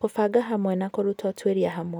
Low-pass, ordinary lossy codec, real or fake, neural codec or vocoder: none; none; real; none